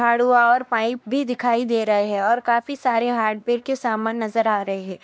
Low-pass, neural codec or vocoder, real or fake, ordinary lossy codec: none; codec, 16 kHz, 2 kbps, X-Codec, WavLM features, trained on Multilingual LibriSpeech; fake; none